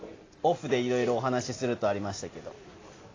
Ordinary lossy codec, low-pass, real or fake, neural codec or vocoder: AAC, 32 kbps; 7.2 kHz; real; none